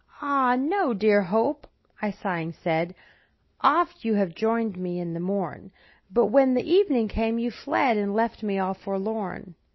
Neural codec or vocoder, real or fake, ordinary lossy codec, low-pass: none; real; MP3, 24 kbps; 7.2 kHz